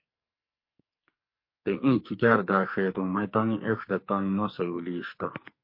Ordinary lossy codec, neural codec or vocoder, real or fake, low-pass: MP3, 32 kbps; codec, 44.1 kHz, 2.6 kbps, SNAC; fake; 5.4 kHz